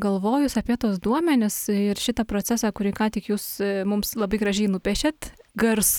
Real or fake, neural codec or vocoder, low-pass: real; none; 19.8 kHz